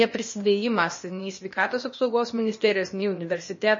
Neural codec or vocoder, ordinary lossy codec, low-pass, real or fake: codec, 16 kHz, 0.8 kbps, ZipCodec; MP3, 32 kbps; 7.2 kHz; fake